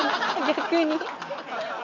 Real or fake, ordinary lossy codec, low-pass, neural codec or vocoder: real; none; 7.2 kHz; none